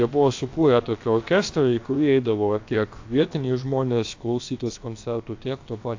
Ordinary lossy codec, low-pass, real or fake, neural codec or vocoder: AAC, 48 kbps; 7.2 kHz; fake; codec, 16 kHz, about 1 kbps, DyCAST, with the encoder's durations